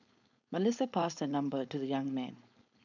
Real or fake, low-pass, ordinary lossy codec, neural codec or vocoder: fake; 7.2 kHz; none; codec, 16 kHz, 4.8 kbps, FACodec